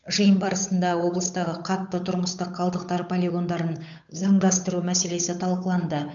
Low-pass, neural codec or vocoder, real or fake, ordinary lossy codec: 7.2 kHz; codec, 16 kHz, 8 kbps, FunCodec, trained on Chinese and English, 25 frames a second; fake; none